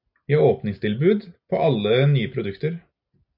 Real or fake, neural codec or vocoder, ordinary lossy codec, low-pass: real; none; AAC, 48 kbps; 5.4 kHz